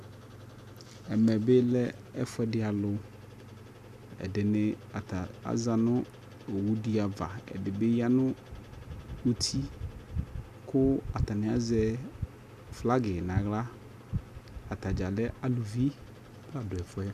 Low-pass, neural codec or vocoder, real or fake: 14.4 kHz; none; real